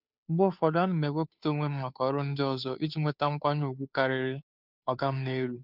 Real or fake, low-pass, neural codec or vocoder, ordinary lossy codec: fake; 5.4 kHz; codec, 16 kHz, 2 kbps, FunCodec, trained on Chinese and English, 25 frames a second; none